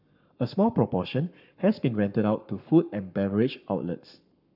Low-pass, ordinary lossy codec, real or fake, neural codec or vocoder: 5.4 kHz; none; fake; codec, 44.1 kHz, 7.8 kbps, Pupu-Codec